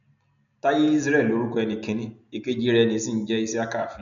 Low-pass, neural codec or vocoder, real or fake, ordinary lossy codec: 7.2 kHz; none; real; MP3, 96 kbps